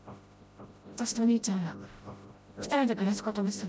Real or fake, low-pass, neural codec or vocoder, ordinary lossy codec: fake; none; codec, 16 kHz, 0.5 kbps, FreqCodec, smaller model; none